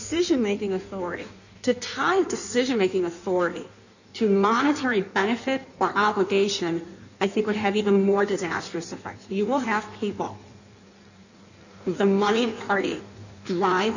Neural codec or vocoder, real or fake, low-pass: codec, 16 kHz in and 24 kHz out, 1.1 kbps, FireRedTTS-2 codec; fake; 7.2 kHz